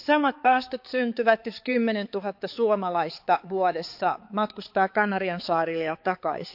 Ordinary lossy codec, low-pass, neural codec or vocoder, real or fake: AAC, 48 kbps; 5.4 kHz; codec, 16 kHz, 4 kbps, X-Codec, HuBERT features, trained on balanced general audio; fake